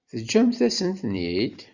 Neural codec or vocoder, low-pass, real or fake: vocoder, 44.1 kHz, 128 mel bands every 256 samples, BigVGAN v2; 7.2 kHz; fake